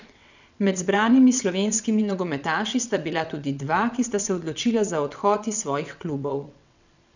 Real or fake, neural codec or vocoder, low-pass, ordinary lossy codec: fake; vocoder, 22.05 kHz, 80 mel bands, WaveNeXt; 7.2 kHz; none